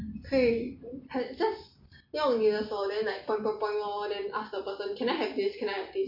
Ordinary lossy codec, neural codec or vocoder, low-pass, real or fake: MP3, 32 kbps; none; 5.4 kHz; real